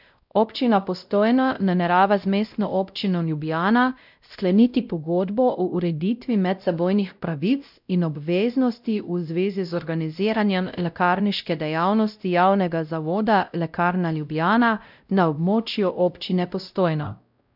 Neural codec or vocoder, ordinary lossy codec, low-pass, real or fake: codec, 16 kHz, 0.5 kbps, X-Codec, WavLM features, trained on Multilingual LibriSpeech; none; 5.4 kHz; fake